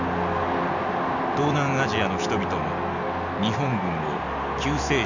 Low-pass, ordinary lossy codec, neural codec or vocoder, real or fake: 7.2 kHz; none; none; real